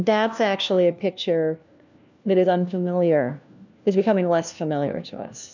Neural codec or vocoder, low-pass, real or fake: codec, 16 kHz, 1 kbps, FunCodec, trained on LibriTTS, 50 frames a second; 7.2 kHz; fake